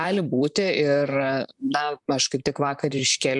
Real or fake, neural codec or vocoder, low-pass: fake; vocoder, 44.1 kHz, 128 mel bands, Pupu-Vocoder; 10.8 kHz